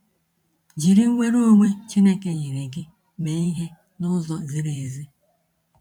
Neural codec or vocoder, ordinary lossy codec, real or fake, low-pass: vocoder, 44.1 kHz, 128 mel bands every 512 samples, BigVGAN v2; none; fake; 19.8 kHz